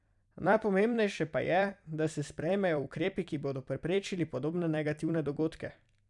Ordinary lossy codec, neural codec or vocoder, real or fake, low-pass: none; vocoder, 44.1 kHz, 128 mel bands every 256 samples, BigVGAN v2; fake; 10.8 kHz